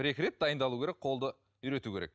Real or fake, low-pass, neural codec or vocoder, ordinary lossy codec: real; none; none; none